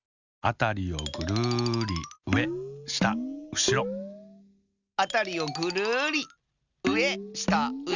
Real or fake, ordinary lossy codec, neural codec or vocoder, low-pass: real; Opus, 64 kbps; none; 7.2 kHz